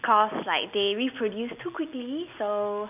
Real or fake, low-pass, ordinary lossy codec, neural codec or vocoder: real; 3.6 kHz; none; none